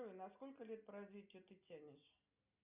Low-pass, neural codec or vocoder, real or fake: 3.6 kHz; none; real